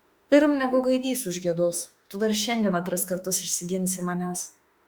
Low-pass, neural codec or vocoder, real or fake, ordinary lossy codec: 19.8 kHz; autoencoder, 48 kHz, 32 numbers a frame, DAC-VAE, trained on Japanese speech; fake; Opus, 64 kbps